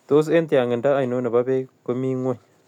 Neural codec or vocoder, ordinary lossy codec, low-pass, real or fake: none; none; 19.8 kHz; real